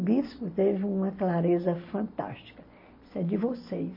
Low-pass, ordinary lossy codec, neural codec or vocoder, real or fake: 5.4 kHz; MP3, 24 kbps; none; real